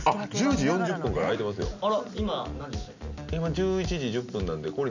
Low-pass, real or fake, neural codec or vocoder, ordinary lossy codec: 7.2 kHz; real; none; none